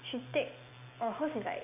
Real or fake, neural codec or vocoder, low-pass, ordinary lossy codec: real; none; 3.6 kHz; none